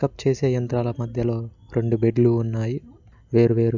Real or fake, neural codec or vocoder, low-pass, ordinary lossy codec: real; none; 7.2 kHz; none